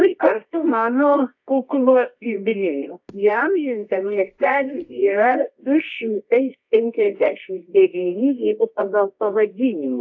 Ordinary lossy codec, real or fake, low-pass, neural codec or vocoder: AAC, 48 kbps; fake; 7.2 kHz; codec, 24 kHz, 0.9 kbps, WavTokenizer, medium music audio release